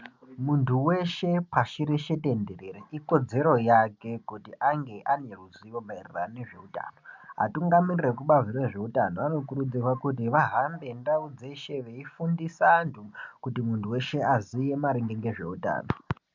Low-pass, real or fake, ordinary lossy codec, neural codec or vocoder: 7.2 kHz; real; MP3, 64 kbps; none